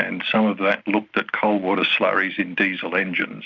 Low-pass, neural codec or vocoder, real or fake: 7.2 kHz; none; real